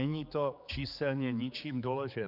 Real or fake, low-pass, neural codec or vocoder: fake; 5.4 kHz; codec, 16 kHz, 4 kbps, X-Codec, HuBERT features, trained on general audio